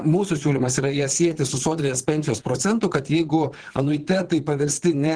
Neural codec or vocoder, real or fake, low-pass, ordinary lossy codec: vocoder, 22.05 kHz, 80 mel bands, Vocos; fake; 9.9 kHz; Opus, 16 kbps